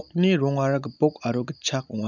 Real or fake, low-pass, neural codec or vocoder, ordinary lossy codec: real; 7.2 kHz; none; none